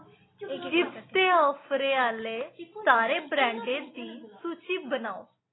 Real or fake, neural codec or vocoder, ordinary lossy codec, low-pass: real; none; AAC, 16 kbps; 7.2 kHz